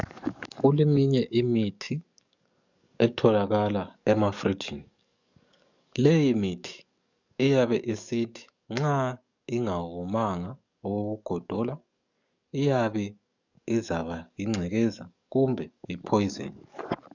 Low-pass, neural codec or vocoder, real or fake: 7.2 kHz; codec, 44.1 kHz, 7.8 kbps, DAC; fake